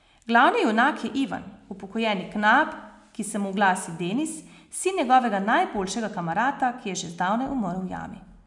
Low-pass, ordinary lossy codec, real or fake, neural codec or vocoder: 10.8 kHz; none; real; none